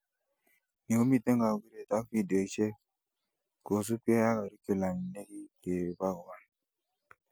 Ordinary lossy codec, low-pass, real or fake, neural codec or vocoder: none; none; real; none